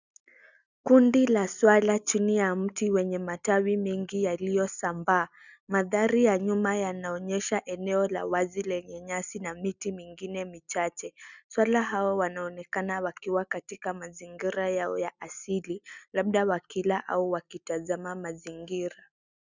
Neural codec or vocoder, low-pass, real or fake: none; 7.2 kHz; real